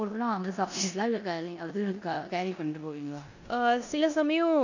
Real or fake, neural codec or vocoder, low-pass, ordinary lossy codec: fake; codec, 16 kHz in and 24 kHz out, 0.9 kbps, LongCat-Audio-Codec, four codebook decoder; 7.2 kHz; none